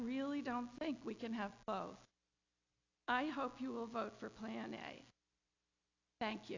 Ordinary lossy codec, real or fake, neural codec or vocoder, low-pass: AAC, 48 kbps; real; none; 7.2 kHz